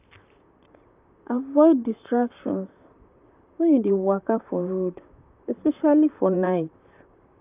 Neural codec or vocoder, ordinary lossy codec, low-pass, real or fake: vocoder, 44.1 kHz, 128 mel bands, Pupu-Vocoder; none; 3.6 kHz; fake